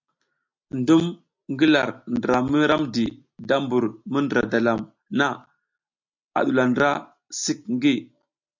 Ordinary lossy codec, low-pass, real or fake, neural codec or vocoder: MP3, 64 kbps; 7.2 kHz; real; none